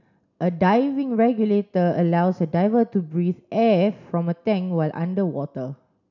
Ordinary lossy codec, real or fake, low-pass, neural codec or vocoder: none; real; 7.2 kHz; none